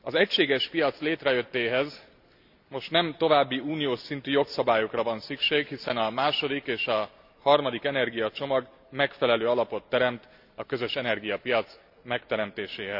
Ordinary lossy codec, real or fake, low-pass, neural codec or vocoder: none; real; 5.4 kHz; none